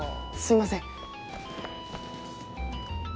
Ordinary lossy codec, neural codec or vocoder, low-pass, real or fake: none; none; none; real